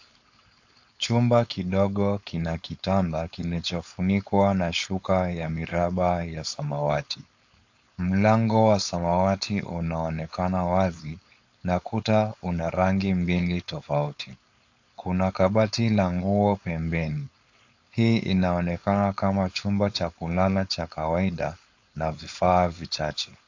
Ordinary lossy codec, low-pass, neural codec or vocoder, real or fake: AAC, 48 kbps; 7.2 kHz; codec, 16 kHz, 4.8 kbps, FACodec; fake